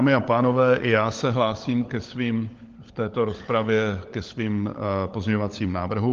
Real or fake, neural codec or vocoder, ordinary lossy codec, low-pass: fake; codec, 16 kHz, 16 kbps, FunCodec, trained on LibriTTS, 50 frames a second; Opus, 24 kbps; 7.2 kHz